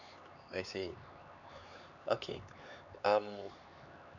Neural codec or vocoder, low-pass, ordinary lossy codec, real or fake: codec, 16 kHz, 4 kbps, X-Codec, HuBERT features, trained on LibriSpeech; 7.2 kHz; none; fake